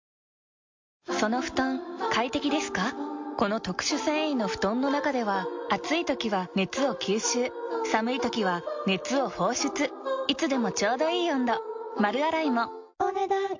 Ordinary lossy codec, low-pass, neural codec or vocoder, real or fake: MP3, 48 kbps; 7.2 kHz; none; real